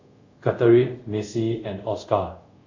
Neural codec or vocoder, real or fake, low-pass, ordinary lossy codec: codec, 24 kHz, 0.5 kbps, DualCodec; fake; 7.2 kHz; AAC, 48 kbps